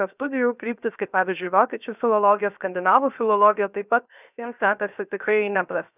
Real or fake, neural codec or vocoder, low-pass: fake; codec, 16 kHz, 0.7 kbps, FocalCodec; 3.6 kHz